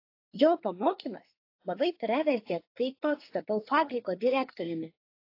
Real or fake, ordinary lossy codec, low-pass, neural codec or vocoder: fake; AAC, 32 kbps; 5.4 kHz; codec, 24 kHz, 1 kbps, SNAC